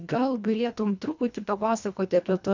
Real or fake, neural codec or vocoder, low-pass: fake; codec, 24 kHz, 1.5 kbps, HILCodec; 7.2 kHz